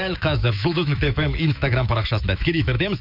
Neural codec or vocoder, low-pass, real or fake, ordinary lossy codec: autoencoder, 48 kHz, 128 numbers a frame, DAC-VAE, trained on Japanese speech; 5.4 kHz; fake; none